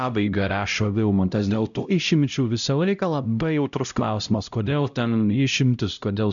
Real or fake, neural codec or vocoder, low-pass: fake; codec, 16 kHz, 0.5 kbps, X-Codec, HuBERT features, trained on LibriSpeech; 7.2 kHz